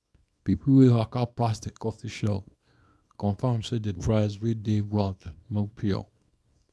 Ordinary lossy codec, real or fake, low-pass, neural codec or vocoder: none; fake; none; codec, 24 kHz, 0.9 kbps, WavTokenizer, small release